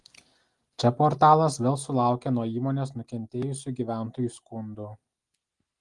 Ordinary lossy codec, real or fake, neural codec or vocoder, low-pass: Opus, 24 kbps; real; none; 10.8 kHz